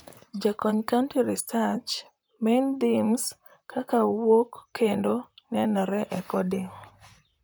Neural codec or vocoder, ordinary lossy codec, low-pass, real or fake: vocoder, 44.1 kHz, 128 mel bands, Pupu-Vocoder; none; none; fake